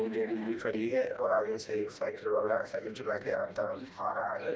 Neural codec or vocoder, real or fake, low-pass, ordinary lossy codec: codec, 16 kHz, 1 kbps, FreqCodec, smaller model; fake; none; none